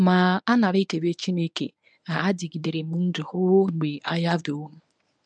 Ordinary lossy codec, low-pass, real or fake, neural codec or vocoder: none; 9.9 kHz; fake; codec, 24 kHz, 0.9 kbps, WavTokenizer, medium speech release version 1